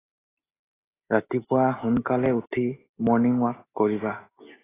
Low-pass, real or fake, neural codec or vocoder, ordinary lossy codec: 3.6 kHz; real; none; AAC, 16 kbps